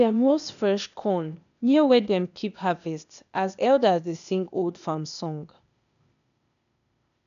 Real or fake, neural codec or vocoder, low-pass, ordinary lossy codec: fake; codec, 16 kHz, 0.8 kbps, ZipCodec; 7.2 kHz; none